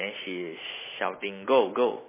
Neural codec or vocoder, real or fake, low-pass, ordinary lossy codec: none; real; 3.6 kHz; MP3, 16 kbps